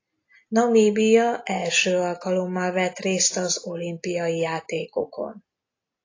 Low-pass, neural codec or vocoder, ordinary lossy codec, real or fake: 7.2 kHz; none; AAC, 32 kbps; real